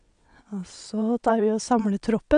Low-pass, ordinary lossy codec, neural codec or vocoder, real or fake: 9.9 kHz; none; vocoder, 22.05 kHz, 80 mel bands, WaveNeXt; fake